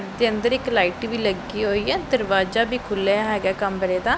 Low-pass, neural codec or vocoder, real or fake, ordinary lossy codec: none; none; real; none